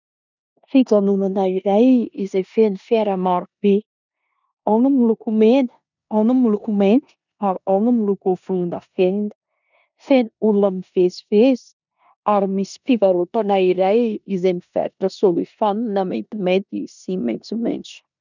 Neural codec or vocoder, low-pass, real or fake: codec, 16 kHz in and 24 kHz out, 0.9 kbps, LongCat-Audio-Codec, four codebook decoder; 7.2 kHz; fake